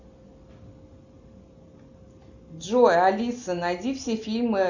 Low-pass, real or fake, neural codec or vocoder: 7.2 kHz; real; none